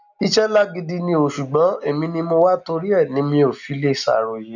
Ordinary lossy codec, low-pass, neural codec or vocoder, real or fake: none; 7.2 kHz; none; real